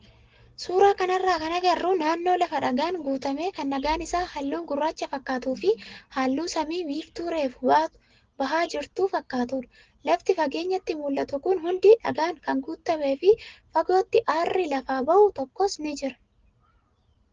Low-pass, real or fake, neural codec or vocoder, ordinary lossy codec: 7.2 kHz; real; none; Opus, 16 kbps